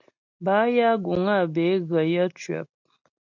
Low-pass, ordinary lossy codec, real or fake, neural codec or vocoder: 7.2 kHz; MP3, 64 kbps; real; none